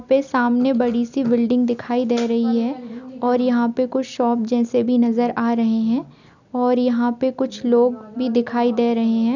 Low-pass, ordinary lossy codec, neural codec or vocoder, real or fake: 7.2 kHz; none; none; real